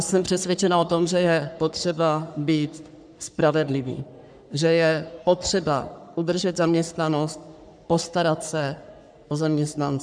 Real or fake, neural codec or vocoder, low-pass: fake; codec, 44.1 kHz, 3.4 kbps, Pupu-Codec; 9.9 kHz